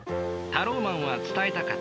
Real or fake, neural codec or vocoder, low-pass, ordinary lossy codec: real; none; none; none